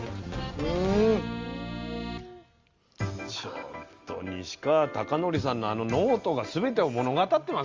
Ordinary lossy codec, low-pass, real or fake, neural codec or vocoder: Opus, 32 kbps; 7.2 kHz; real; none